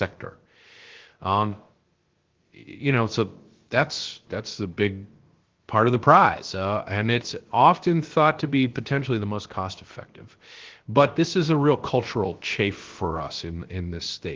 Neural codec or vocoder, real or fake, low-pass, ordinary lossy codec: codec, 16 kHz, about 1 kbps, DyCAST, with the encoder's durations; fake; 7.2 kHz; Opus, 16 kbps